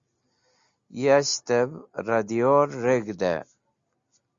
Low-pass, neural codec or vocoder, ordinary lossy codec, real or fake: 7.2 kHz; none; Opus, 64 kbps; real